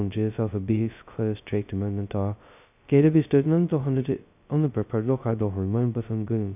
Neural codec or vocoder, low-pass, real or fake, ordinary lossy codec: codec, 16 kHz, 0.2 kbps, FocalCodec; 3.6 kHz; fake; none